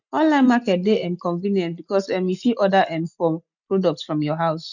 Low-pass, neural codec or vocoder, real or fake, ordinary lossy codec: 7.2 kHz; none; real; none